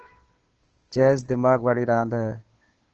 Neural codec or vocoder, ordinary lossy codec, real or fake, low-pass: codec, 16 kHz, 2 kbps, FunCodec, trained on Chinese and English, 25 frames a second; Opus, 16 kbps; fake; 7.2 kHz